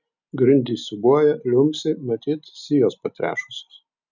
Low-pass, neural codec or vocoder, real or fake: 7.2 kHz; none; real